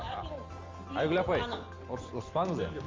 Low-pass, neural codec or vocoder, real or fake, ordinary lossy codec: 7.2 kHz; none; real; Opus, 32 kbps